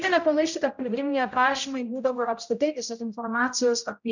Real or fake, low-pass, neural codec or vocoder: fake; 7.2 kHz; codec, 16 kHz, 0.5 kbps, X-Codec, HuBERT features, trained on general audio